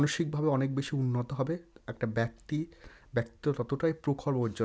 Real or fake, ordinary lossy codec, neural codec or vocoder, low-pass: real; none; none; none